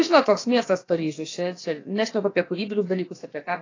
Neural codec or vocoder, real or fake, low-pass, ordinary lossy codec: codec, 16 kHz, about 1 kbps, DyCAST, with the encoder's durations; fake; 7.2 kHz; AAC, 32 kbps